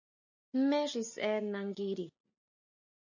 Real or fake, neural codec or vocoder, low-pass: real; none; 7.2 kHz